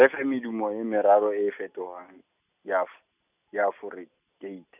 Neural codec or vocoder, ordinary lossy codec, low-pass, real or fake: none; none; 3.6 kHz; real